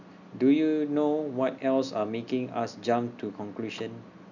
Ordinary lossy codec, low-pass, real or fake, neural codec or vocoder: none; 7.2 kHz; real; none